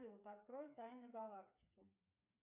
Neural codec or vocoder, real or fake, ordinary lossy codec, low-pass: codec, 16 kHz, 4 kbps, FreqCodec, smaller model; fake; MP3, 32 kbps; 3.6 kHz